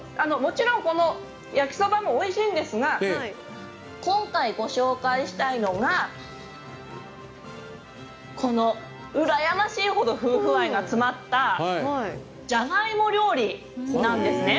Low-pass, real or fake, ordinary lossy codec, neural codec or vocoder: none; real; none; none